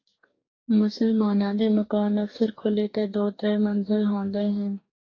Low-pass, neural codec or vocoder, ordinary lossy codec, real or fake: 7.2 kHz; codec, 44.1 kHz, 2.6 kbps, DAC; AAC, 32 kbps; fake